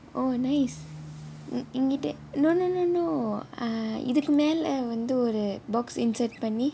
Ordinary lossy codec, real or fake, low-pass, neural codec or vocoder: none; real; none; none